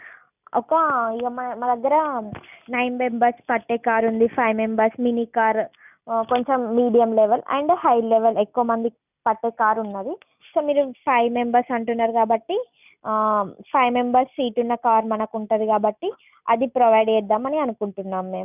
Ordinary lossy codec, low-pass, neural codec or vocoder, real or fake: none; 3.6 kHz; none; real